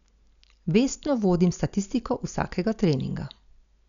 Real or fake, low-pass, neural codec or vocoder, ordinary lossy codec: real; 7.2 kHz; none; none